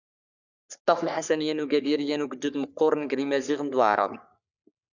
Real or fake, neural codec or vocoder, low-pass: fake; codec, 44.1 kHz, 3.4 kbps, Pupu-Codec; 7.2 kHz